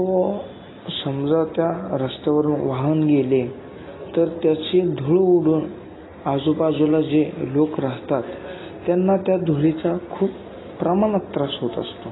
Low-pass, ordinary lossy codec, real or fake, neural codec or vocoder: 7.2 kHz; AAC, 16 kbps; real; none